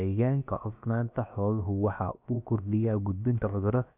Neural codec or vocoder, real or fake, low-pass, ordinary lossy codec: codec, 16 kHz, about 1 kbps, DyCAST, with the encoder's durations; fake; 3.6 kHz; none